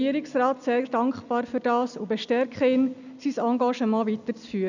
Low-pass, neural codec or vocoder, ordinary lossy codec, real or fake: 7.2 kHz; none; none; real